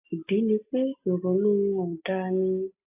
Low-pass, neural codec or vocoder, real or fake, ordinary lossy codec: 3.6 kHz; none; real; AAC, 24 kbps